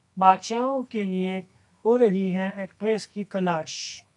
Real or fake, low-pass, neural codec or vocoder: fake; 10.8 kHz; codec, 24 kHz, 0.9 kbps, WavTokenizer, medium music audio release